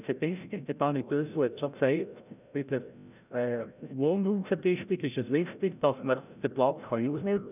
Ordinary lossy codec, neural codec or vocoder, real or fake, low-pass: none; codec, 16 kHz, 0.5 kbps, FreqCodec, larger model; fake; 3.6 kHz